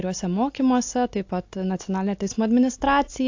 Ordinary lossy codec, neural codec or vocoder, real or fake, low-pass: AAC, 48 kbps; none; real; 7.2 kHz